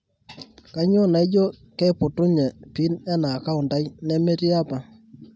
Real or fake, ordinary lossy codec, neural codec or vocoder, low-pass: real; none; none; none